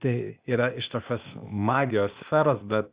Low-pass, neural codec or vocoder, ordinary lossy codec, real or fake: 3.6 kHz; codec, 16 kHz, 0.8 kbps, ZipCodec; Opus, 64 kbps; fake